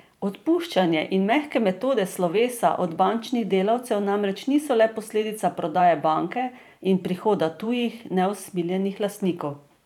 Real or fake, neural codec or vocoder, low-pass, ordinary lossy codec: fake; vocoder, 44.1 kHz, 128 mel bands every 512 samples, BigVGAN v2; 19.8 kHz; none